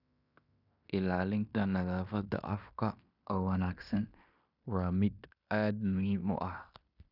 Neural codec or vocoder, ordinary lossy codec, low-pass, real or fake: codec, 16 kHz in and 24 kHz out, 0.9 kbps, LongCat-Audio-Codec, fine tuned four codebook decoder; none; 5.4 kHz; fake